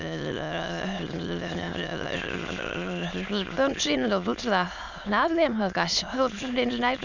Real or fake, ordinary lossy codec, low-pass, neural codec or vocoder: fake; none; 7.2 kHz; autoencoder, 22.05 kHz, a latent of 192 numbers a frame, VITS, trained on many speakers